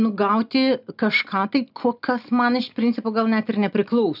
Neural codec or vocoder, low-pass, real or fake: none; 5.4 kHz; real